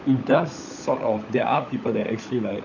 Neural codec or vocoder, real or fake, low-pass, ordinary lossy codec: codec, 16 kHz, 16 kbps, FunCodec, trained on LibriTTS, 50 frames a second; fake; 7.2 kHz; none